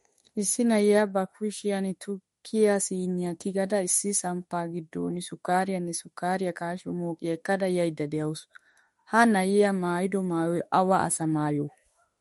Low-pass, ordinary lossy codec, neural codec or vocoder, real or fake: 19.8 kHz; MP3, 48 kbps; autoencoder, 48 kHz, 32 numbers a frame, DAC-VAE, trained on Japanese speech; fake